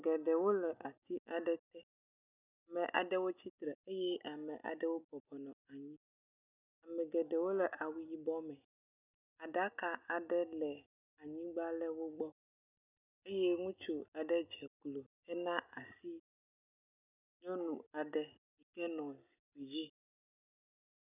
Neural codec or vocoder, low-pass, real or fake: none; 3.6 kHz; real